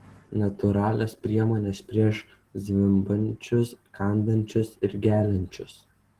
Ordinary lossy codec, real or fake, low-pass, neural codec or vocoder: Opus, 16 kbps; real; 14.4 kHz; none